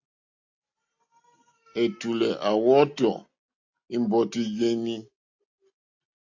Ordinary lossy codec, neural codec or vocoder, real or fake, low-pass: AAC, 48 kbps; none; real; 7.2 kHz